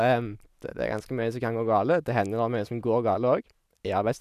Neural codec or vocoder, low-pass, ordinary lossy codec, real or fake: none; 14.4 kHz; none; real